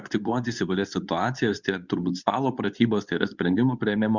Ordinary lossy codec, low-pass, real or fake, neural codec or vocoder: Opus, 64 kbps; 7.2 kHz; fake; codec, 24 kHz, 0.9 kbps, WavTokenizer, medium speech release version 2